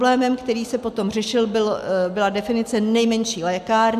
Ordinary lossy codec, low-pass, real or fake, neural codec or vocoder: AAC, 96 kbps; 14.4 kHz; real; none